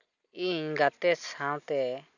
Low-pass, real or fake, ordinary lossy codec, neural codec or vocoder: 7.2 kHz; real; none; none